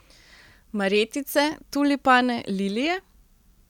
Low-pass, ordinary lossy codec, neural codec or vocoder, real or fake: 19.8 kHz; none; none; real